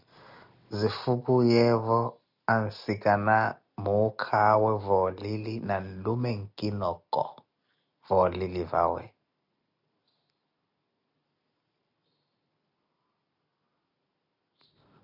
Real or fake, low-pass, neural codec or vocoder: real; 5.4 kHz; none